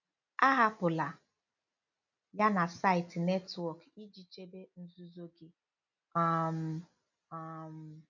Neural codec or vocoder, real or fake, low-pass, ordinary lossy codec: none; real; 7.2 kHz; none